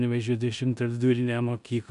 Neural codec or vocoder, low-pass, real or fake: codec, 16 kHz in and 24 kHz out, 0.9 kbps, LongCat-Audio-Codec, four codebook decoder; 10.8 kHz; fake